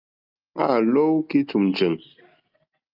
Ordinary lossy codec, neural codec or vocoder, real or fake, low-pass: Opus, 24 kbps; none; real; 5.4 kHz